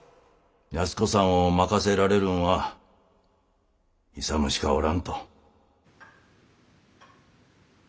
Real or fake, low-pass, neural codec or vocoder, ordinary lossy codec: real; none; none; none